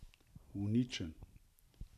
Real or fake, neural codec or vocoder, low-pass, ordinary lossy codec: real; none; 14.4 kHz; none